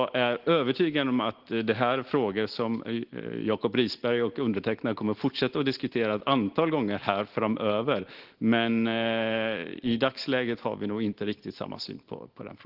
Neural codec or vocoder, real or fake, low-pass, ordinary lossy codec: none; real; 5.4 kHz; Opus, 16 kbps